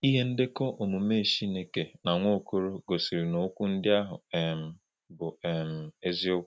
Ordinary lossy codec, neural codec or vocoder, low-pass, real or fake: none; none; none; real